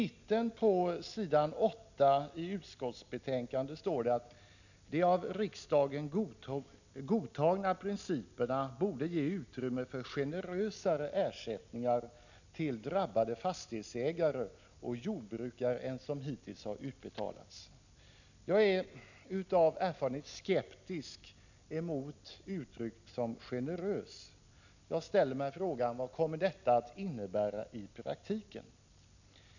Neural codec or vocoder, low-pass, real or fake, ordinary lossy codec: none; 7.2 kHz; real; none